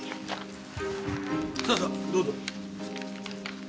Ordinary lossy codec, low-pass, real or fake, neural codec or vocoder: none; none; real; none